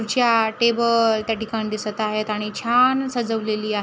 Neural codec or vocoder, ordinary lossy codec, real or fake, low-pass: none; none; real; none